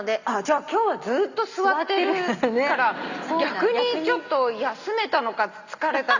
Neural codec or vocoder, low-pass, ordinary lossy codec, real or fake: none; 7.2 kHz; Opus, 64 kbps; real